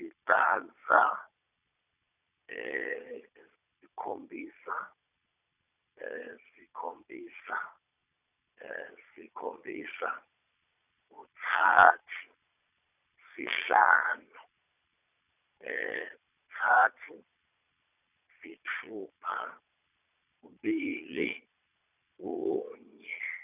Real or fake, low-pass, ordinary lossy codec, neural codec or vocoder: fake; 3.6 kHz; none; vocoder, 22.05 kHz, 80 mel bands, Vocos